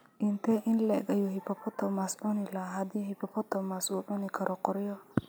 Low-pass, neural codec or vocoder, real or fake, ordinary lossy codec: none; none; real; none